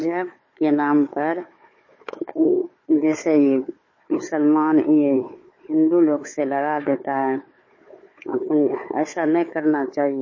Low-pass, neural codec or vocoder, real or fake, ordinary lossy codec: 7.2 kHz; codec, 16 kHz, 4 kbps, FunCodec, trained on Chinese and English, 50 frames a second; fake; MP3, 32 kbps